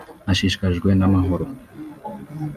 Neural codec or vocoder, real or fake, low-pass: none; real; 14.4 kHz